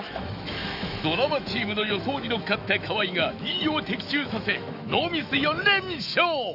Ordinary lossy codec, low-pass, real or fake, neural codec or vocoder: none; 5.4 kHz; fake; autoencoder, 48 kHz, 128 numbers a frame, DAC-VAE, trained on Japanese speech